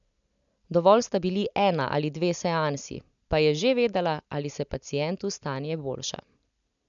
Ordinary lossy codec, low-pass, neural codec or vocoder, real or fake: none; 7.2 kHz; none; real